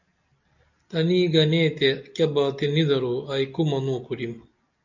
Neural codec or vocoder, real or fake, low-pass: none; real; 7.2 kHz